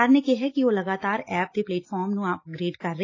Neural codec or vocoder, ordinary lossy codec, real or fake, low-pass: none; AAC, 32 kbps; real; 7.2 kHz